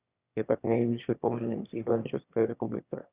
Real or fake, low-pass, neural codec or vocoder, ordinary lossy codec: fake; 3.6 kHz; autoencoder, 22.05 kHz, a latent of 192 numbers a frame, VITS, trained on one speaker; Opus, 64 kbps